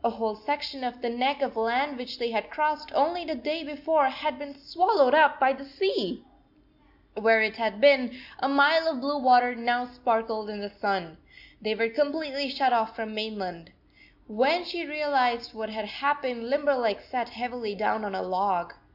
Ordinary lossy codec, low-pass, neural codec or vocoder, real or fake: AAC, 48 kbps; 5.4 kHz; none; real